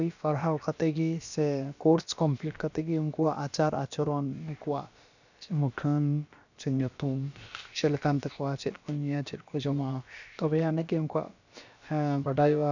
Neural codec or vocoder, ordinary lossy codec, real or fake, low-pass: codec, 16 kHz, about 1 kbps, DyCAST, with the encoder's durations; none; fake; 7.2 kHz